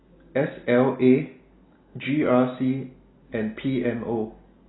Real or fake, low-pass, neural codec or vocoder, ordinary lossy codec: real; 7.2 kHz; none; AAC, 16 kbps